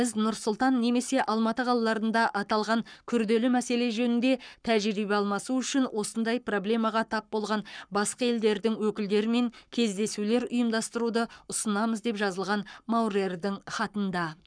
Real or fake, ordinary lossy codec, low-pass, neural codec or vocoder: fake; none; 9.9 kHz; codec, 44.1 kHz, 7.8 kbps, Pupu-Codec